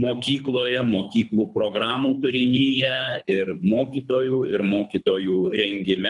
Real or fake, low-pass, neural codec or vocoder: fake; 10.8 kHz; codec, 24 kHz, 3 kbps, HILCodec